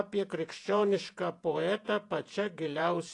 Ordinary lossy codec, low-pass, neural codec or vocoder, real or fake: AAC, 48 kbps; 10.8 kHz; vocoder, 48 kHz, 128 mel bands, Vocos; fake